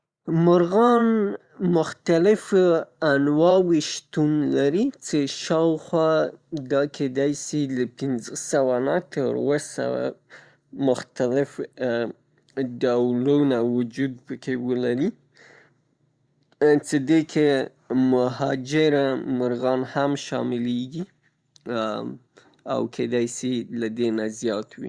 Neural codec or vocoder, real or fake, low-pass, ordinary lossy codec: vocoder, 44.1 kHz, 128 mel bands every 512 samples, BigVGAN v2; fake; 9.9 kHz; Opus, 64 kbps